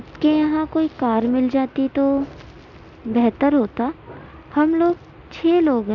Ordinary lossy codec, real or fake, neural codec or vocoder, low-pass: none; real; none; 7.2 kHz